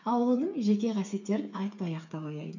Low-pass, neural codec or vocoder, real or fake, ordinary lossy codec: 7.2 kHz; codec, 16 kHz, 8 kbps, FreqCodec, smaller model; fake; none